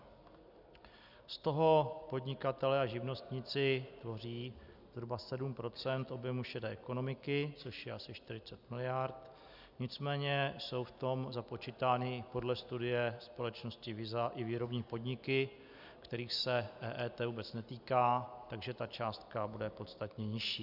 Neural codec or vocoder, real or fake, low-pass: none; real; 5.4 kHz